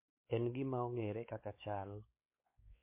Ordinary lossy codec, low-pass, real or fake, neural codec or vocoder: MP3, 24 kbps; 3.6 kHz; fake; codec, 16 kHz, 4 kbps, X-Codec, WavLM features, trained on Multilingual LibriSpeech